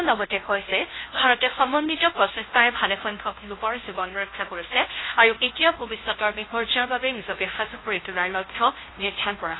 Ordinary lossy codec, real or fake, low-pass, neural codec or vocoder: AAC, 16 kbps; fake; 7.2 kHz; codec, 16 kHz, 0.5 kbps, FunCodec, trained on Chinese and English, 25 frames a second